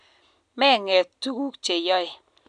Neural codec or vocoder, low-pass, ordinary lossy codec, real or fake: none; 9.9 kHz; none; real